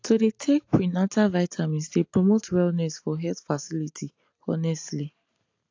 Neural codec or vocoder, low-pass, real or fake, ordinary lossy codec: none; 7.2 kHz; real; AAC, 48 kbps